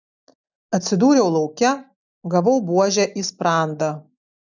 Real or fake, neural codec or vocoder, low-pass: real; none; 7.2 kHz